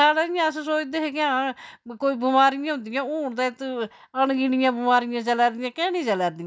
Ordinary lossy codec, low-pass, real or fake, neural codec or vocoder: none; none; real; none